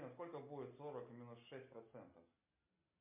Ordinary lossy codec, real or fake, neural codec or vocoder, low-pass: MP3, 24 kbps; real; none; 3.6 kHz